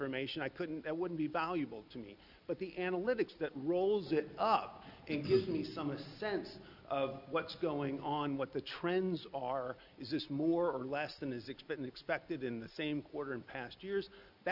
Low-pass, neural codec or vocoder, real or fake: 5.4 kHz; none; real